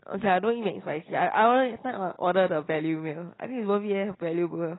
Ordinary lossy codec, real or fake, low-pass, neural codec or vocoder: AAC, 16 kbps; real; 7.2 kHz; none